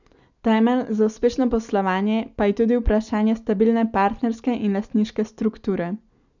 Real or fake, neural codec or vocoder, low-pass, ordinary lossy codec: real; none; 7.2 kHz; none